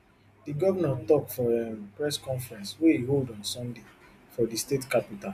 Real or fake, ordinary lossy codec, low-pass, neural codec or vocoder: real; none; 14.4 kHz; none